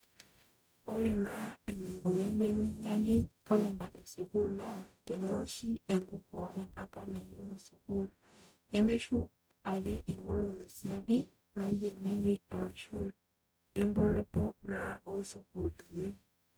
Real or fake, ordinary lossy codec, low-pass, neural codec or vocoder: fake; none; none; codec, 44.1 kHz, 0.9 kbps, DAC